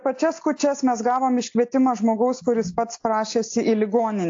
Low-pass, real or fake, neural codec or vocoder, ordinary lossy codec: 7.2 kHz; real; none; AAC, 48 kbps